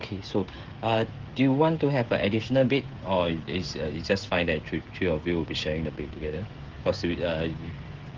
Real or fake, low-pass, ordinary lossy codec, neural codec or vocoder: fake; 7.2 kHz; Opus, 16 kbps; codec, 16 kHz, 16 kbps, FreqCodec, smaller model